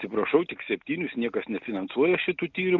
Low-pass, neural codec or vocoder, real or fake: 7.2 kHz; none; real